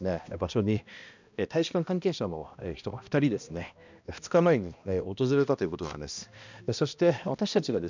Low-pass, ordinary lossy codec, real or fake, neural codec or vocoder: 7.2 kHz; none; fake; codec, 16 kHz, 1 kbps, X-Codec, HuBERT features, trained on balanced general audio